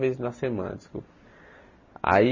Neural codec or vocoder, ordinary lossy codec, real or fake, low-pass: none; MP3, 32 kbps; real; 7.2 kHz